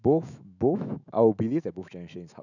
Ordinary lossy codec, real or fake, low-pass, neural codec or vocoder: none; fake; 7.2 kHz; autoencoder, 48 kHz, 128 numbers a frame, DAC-VAE, trained on Japanese speech